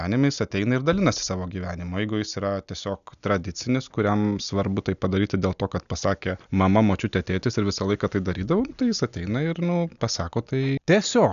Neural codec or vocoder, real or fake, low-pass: none; real; 7.2 kHz